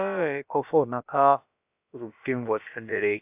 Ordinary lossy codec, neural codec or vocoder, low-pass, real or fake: AAC, 32 kbps; codec, 16 kHz, about 1 kbps, DyCAST, with the encoder's durations; 3.6 kHz; fake